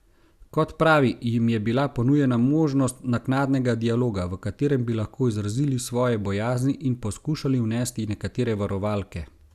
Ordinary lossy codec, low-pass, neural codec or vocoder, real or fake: Opus, 64 kbps; 14.4 kHz; none; real